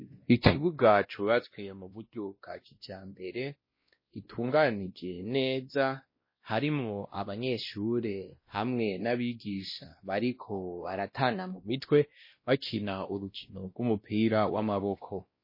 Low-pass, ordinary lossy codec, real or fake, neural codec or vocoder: 5.4 kHz; MP3, 24 kbps; fake; codec, 16 kHz, 1 kbps, X-Codec, WavLM features, trained on Multilingual LibriSpeech